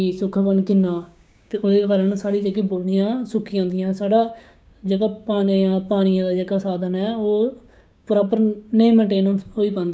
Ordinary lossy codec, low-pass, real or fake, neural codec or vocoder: none; none; fake; codec, 16 kHz, 6 kbps, DAC